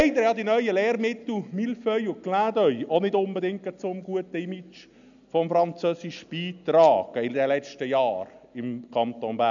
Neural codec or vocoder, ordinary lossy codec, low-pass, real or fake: none; none; 7.2 kHz; real